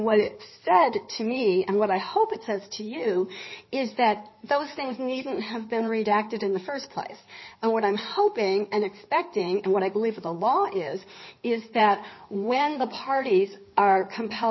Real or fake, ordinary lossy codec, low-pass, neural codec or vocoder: fake; MP3, 24 kbps; 7.2 kHz; codec, 16 kHz in and 24 kHz out, 2.2 kbps, FireRedTTS-2 codec